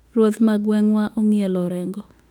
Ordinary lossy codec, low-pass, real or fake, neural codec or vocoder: none; 19.8 kHz; fake; autoencoder, 48 kHz, 32 numbers a frame, DAC-VAE, trained on Japanese speech